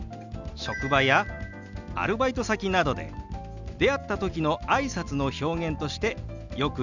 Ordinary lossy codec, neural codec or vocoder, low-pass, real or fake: none; none; 7.2 kHz; real